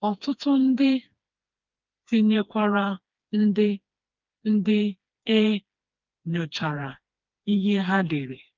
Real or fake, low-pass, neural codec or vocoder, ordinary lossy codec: fake; 7.2 kHz; codec, 16 kHz, 2 kbps, FreqCodec, smaller model; Opus, 32 kbps